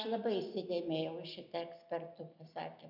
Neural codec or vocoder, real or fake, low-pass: none; real; 5.4 kHz